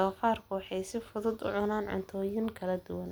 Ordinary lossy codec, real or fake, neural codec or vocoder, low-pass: none; real; none; none